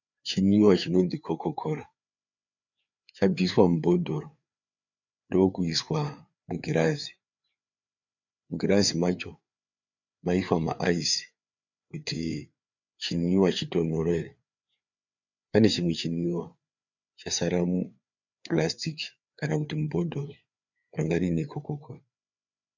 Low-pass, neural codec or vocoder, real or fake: 7.2 kHz; codec, 16 kHz, 4 kbps, FreqCodec, larger model; fake